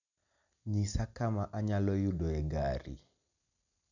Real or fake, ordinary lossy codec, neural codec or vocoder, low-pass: real; none; none; 7.2 kHz